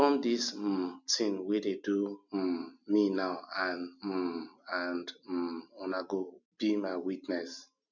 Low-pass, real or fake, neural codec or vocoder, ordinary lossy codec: 7.2 kHz; real; none; none